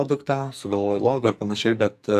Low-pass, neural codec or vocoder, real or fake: 14.4 kHz; codec, 44.1 kHz, 2.6 kbps, SNAC; fake